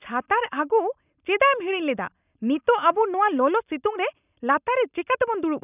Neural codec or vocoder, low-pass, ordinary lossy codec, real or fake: none; 3.6 kHz; none; real